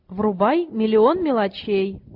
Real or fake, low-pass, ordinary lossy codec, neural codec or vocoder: real; 5.4 kHz; AAC, 48 kbps; none